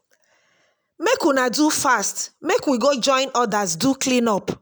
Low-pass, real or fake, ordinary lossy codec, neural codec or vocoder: none; real; none; none